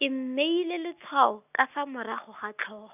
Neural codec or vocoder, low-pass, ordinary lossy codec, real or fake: none; 3.6 kHz; none; real